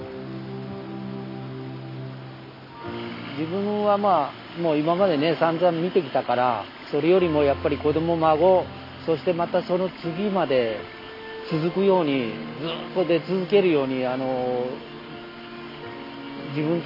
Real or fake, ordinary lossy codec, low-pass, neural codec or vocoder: real; AAC, 32 kbps; 5.4 kHz; none